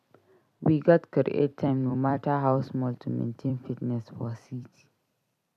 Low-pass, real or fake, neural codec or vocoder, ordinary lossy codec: 14.4 kHz; fake; vocoder, 44.1 kHz, 128 mel bands every 256 samples, BigVGAN v2; none